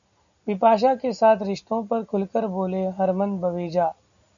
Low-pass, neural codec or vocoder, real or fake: 7.2 kHz; none; real